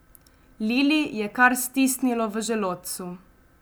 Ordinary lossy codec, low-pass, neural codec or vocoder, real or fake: none; none; none; real